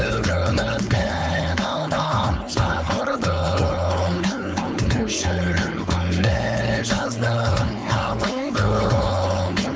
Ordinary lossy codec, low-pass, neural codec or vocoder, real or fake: none; none; codec, 16 kHz, 4.8 kbps, FACodec; fake